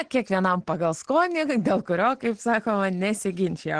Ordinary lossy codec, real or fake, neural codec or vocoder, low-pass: Opus, 16 kbps; real; none; 9.9 kHz